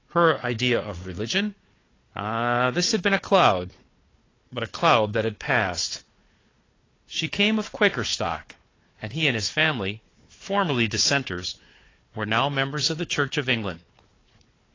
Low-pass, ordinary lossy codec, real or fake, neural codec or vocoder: 7.2 kHz; AAC, 32 kbps; fake; codec, 16 kHz, 4 kbps, FunCodec, trained on Chinese and English, 50 frames a second